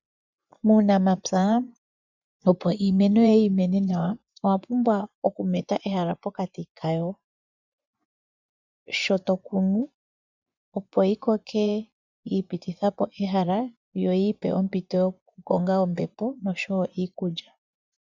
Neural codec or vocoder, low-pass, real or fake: vocoder, 24 kHz, 100 mel bands, Vocos; 7.2 kHz; fake